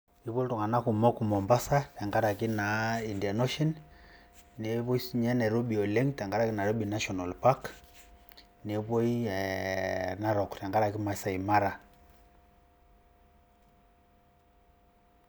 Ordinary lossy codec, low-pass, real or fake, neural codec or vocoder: none; none; real; none